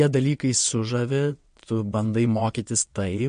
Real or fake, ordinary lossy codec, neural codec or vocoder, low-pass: fake; MP3, 48 kbps; vocoder, 22.05 kHz, 80 mel bands, WaveNeXt; 9.9 kHz